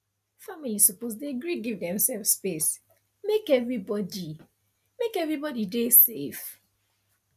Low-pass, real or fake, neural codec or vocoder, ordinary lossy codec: 14.4 kHz; real; none; none